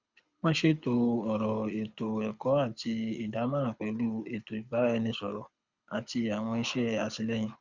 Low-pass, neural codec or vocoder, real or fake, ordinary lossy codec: 7.2 kHz; codec, 24 kHz, 6 kbps, HILCodec; fake; Opus, 64 kbps